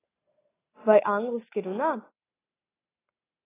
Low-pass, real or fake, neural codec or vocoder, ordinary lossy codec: 3.6 kHz; real; none; AAC, 16 kbps